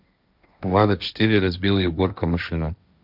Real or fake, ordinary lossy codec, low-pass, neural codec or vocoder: fake; none; 5.4 kHz; codec, 16 kHz, 1.1 kbps, Voila-Tokenizer